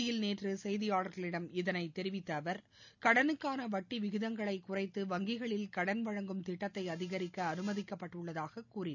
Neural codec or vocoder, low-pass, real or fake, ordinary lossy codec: none; 7.2 kHz; real; none